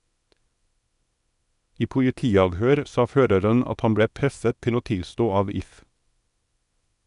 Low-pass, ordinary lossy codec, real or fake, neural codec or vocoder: 10.8 kHz; none; fake; codec, 24 kHz, 0.9 kbps, WavTokenizer, small release